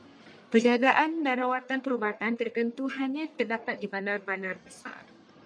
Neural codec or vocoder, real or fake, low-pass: codec, 44.1 kHz, 1.7 kbps, Pupu-Codec; fake; 9.9 kHz